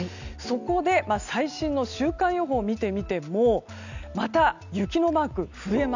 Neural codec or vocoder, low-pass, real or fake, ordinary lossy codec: none; 7.2 kHz; real; none